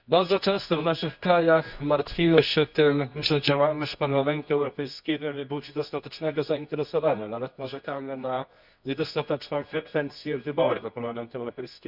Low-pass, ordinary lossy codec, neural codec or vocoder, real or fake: 5.4 kHz; none; codec, 24 kHz, 0.9 kbps, WavTokenizer, medium music audio release; fake